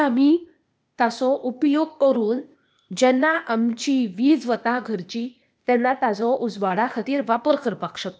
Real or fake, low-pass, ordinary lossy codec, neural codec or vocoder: fake; none; none; codec, 16 kHz, 0.8 kbps, ZipCodec